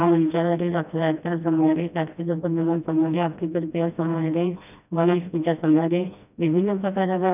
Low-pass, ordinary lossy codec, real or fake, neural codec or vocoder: 3.6 kHz; none; fake; codec, 16 kHz, 1 kbps, FreqCodec, smaller model